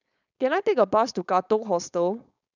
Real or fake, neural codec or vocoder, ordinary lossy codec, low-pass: fake; codec, 16 kHz, 4.8 kbps, FACodec; none; 7.2 kHz